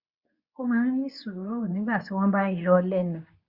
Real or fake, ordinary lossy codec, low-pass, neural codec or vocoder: fake; Opus, 64 kbps; 5.4 kHz; codec, 24 kHz, 0.9 kbps, WavTokenizer, medium speech release version 2